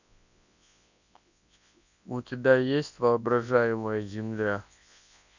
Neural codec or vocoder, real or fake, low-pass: codec, 24 kHz, 0.9 kbps, WavTokenizer, large speech release; fake; 7.2 kHz